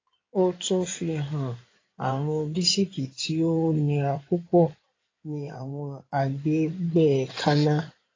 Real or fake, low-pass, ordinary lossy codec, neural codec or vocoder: fake; 7.2 kHz; AAC, 32 kbps; codec, 16 kHz in and 24 kHz out, 2.2 kbps, FireRedTTS-2 codec